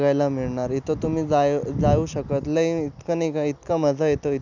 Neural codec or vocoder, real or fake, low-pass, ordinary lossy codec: none; real; 7.2 kHz; none